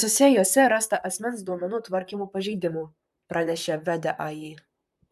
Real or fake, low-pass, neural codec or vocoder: fake; 14.4 kHz; vocoder, 44.1 kHz, 128 mel bands, Pupu-Vocoder